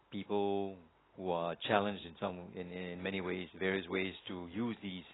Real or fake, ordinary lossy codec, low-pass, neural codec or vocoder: real; AAC, 16 kbps; 7.2 kHz; none